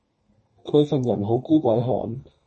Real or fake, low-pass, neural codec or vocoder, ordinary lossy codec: fake; 10.8 kHz; codec, 32 kHz, 1.9 kbps, SNAC; MP3, 32 kbps